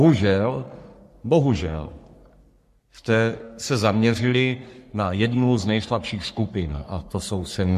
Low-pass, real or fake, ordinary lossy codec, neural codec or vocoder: 14.4 kHz; fake; MP3, 64 kbps; codec, 44.1 kHz, 3.4 kbps, Pupu-Codec